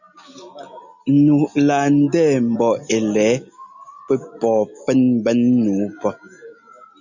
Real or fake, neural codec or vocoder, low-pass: real; none; 7.2 kHz